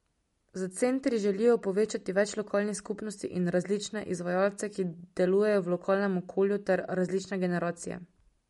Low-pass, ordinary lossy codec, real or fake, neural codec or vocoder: 19.8 kHz; MP3, 48 kbps; real; none